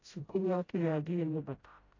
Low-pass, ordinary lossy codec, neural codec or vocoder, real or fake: 7.2 kHz; AAC, 32 kbps; codec, 16 kHz, 0.5 kbps, FreqCodec, smaller model; fake